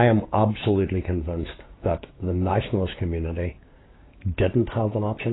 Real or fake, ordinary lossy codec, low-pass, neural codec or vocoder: fake; AAC, 16 kbps; 7.2 kHz; codec, 16 kHz, 6 kbps, DAC